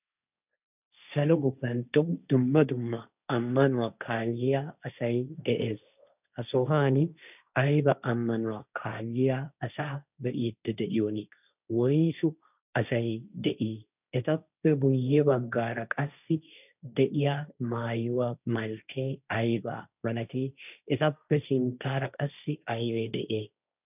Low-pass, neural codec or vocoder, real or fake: 3.6 kHz; codec, 16 kHz, 1.1 kbps, Voila-Tokenizer; fake